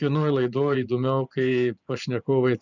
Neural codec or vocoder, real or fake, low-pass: vocoder, 24 kHz, 100 mel bands, Vocos; fake; 7.2 kHz